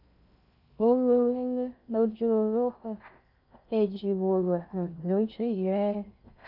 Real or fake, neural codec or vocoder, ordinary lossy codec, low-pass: fake; codec, 16 kHz in and 24 kHz out, 0.6 kbps, FocalCodec, streaming, 2048 codes; none; 5.4 kHz